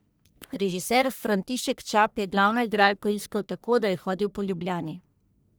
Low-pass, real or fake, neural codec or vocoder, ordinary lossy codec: none; fake; codec, 44.1 kHz, 1.7 kbps, Pupu-Codec; none